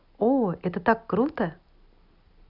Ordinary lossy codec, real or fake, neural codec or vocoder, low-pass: none; real; none; 5.4 kHz